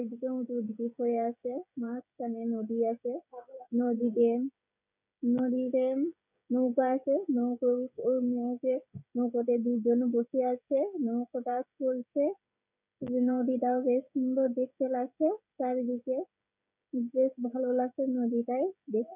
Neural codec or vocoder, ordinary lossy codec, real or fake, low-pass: codec, 16 kHz, 16 kbps, FreqCodec, smaller model; none; fake; 3.6 kHz